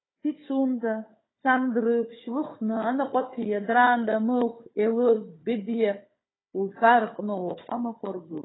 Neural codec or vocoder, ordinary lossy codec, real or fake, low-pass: codec, 16 kHz, 4 kbps, FunCodec, trained on Chinese and English, 50 frames a second; AAC, 16 kbps; fake; 7.2 kHz